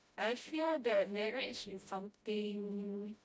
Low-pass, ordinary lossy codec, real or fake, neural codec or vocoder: none; none; fake; codec, 16 kHz, 0.5 kbps, FreqCodec, smaller model